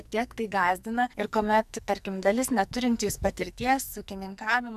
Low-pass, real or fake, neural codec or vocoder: 14.4 kHz; fake; codec, 44.1 kHz, 2.6 kbps, SNAC